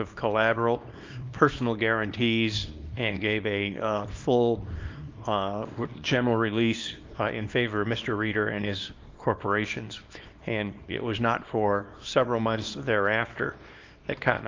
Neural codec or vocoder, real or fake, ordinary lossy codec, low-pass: codec, 24 kHz, 0.9 kbps, WavTokenizer, small release; fake; Opus, 24 kbps; 7.2 kHz